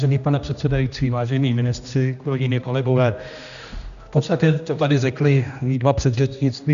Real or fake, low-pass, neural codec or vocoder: fake; 7.2 kHz; codec, 16 kHz, 1 kbps, X-Codec, HuBERT features, trained on general audio